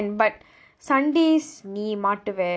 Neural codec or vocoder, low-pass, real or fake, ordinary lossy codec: none; none; real; none